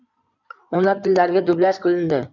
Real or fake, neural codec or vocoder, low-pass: fake; codec, 16 kHz in and 24 kHz out, 2.2 kbps, FireRedTTS-2 codec; 7.2 kHz